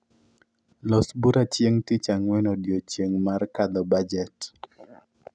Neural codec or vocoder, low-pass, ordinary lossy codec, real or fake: none; 9.9 kHz; none; real